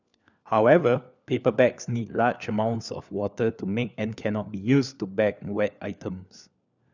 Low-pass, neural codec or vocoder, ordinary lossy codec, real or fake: 7.2 kHz; codec, 16 kHz, 4 kbps, FunCodec, trained on LibriTTS, 50 frames a second; none; fake